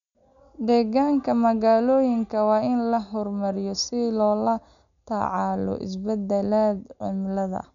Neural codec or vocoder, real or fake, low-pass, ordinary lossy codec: none; real; 7.2 kHz; none